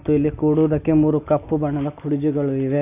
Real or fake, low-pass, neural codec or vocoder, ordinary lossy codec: real; 3.6 kHz; none; AAC, 24 kbps